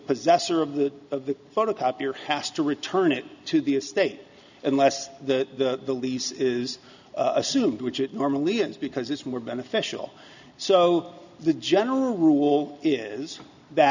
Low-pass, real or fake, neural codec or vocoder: 7.2 kHz; real; none